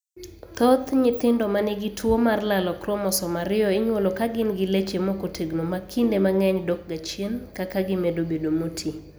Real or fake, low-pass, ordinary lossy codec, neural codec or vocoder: real; none; none; none